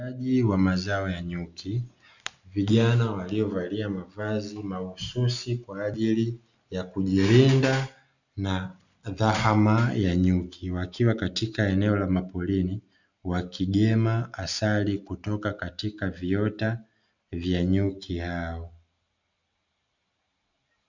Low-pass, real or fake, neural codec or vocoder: 7.2 kHz; real; none